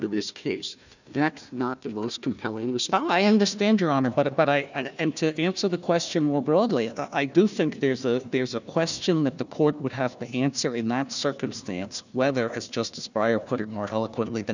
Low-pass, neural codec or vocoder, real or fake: 7.2 kHz; codec, 16 kHz, 1 kbps, FunCodec, trained on Chinese and English, 50 frames a second; fake